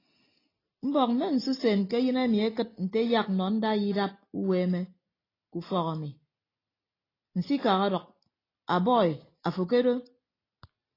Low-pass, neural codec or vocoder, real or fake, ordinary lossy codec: 5.4 kHz; none; real; AAC, 24 kbps